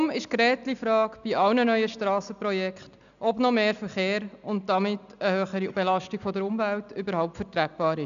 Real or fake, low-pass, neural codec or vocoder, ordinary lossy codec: real; 7.2 kHz; none; none